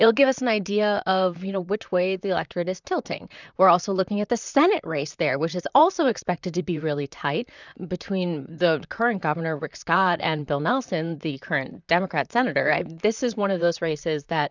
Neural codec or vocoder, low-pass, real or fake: vocoder, 44.1 kHz, 128 mel bands, Pupu-Vocoder; 7.2 kHz; fake